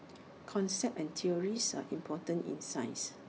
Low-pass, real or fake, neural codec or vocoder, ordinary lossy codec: none; real; none; none